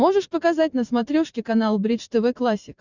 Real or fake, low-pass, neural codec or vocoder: fake; 7.2 kHz; vocoder, 44.1 kHz, 128 mel bands every 512 samples, BigVGAN v2